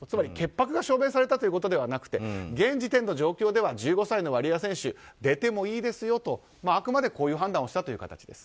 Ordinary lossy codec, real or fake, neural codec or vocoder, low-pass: none; real; none; none